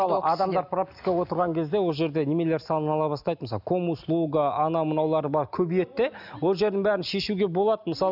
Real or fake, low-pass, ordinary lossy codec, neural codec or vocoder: real; 5.4 kHz; none; none